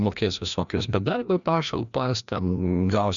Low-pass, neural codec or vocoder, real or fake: 7.2 kHz; codec, 16 kHz, 1 kbps, FreqCodec, larger model; fake